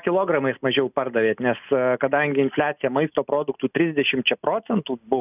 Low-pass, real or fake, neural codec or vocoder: 3.6 kHz; real; none